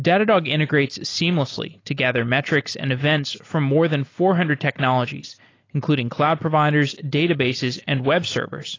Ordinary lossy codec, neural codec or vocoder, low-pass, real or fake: AAC, 32 kbps; none; 7.2 kHz; real